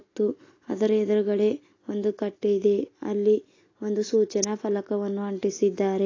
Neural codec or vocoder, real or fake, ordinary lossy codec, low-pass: none; real; AAC, 32 kbps; 7.2 kHz